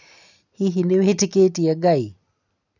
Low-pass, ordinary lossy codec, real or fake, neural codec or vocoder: 7.2 kHz; none; real; none